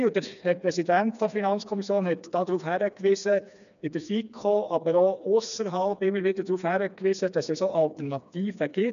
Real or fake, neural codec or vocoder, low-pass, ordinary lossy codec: fake; codec, 16 kHz, 2 kbps, FreqCodec, smaller model; 7.2 kHz; none